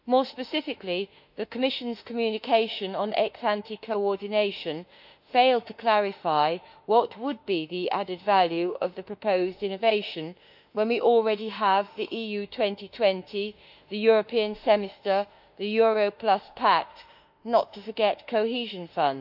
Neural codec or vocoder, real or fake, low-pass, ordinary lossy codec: autoencoder, 48 kHz, 32 numbers a frame, DAC-VAE, trained on Japanese speech; fake; 5.4 kHz; none